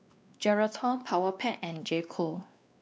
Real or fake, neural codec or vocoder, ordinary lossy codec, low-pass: fake; codec, 16 kHz, 2 kbps, X-Codec, WavLM features, trained on Multilingual LibriSpeech; none; none